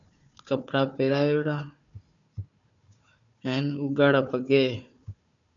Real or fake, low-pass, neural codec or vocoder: fake; 7.2 kHz; codec, 16 kHz, 4 kbps, FunCodec, trained on Chinese and English, 50 frames a second